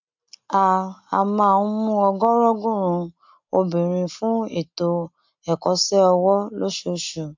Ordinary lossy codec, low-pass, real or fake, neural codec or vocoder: MP3, 64 kbps; 7.2 kHz; real; none